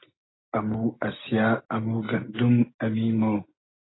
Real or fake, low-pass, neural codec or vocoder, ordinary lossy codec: real; 7.2 kHz; none; AAC, 16 kbps